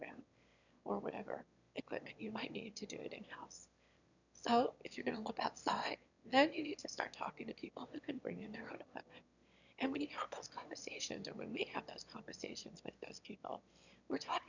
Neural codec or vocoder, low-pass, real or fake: autoencoder, 22.05 kHz, a latent of 192 numbers a frame, VITS, trained on one speaker; 7.2 kHz; fake